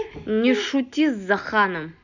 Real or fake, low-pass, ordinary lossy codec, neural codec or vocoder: real; 7.2 kHz; none; none